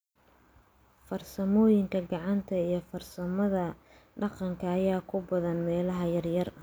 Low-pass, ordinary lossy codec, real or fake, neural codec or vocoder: none; none; real; none